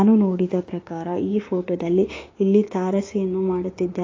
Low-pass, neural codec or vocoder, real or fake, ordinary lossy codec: 7.2 kHz; codec, 16 kHz, 6 kbps, DAC; fake; AAC, 32 kbps